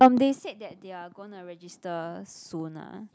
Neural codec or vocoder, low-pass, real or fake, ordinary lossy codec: none; none; real; none